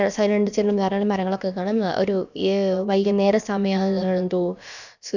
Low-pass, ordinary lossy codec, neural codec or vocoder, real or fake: 7.2 kHz; none; codec, 16 kHz, about 1 kbps, DyCAST, with the encoder's durations; fake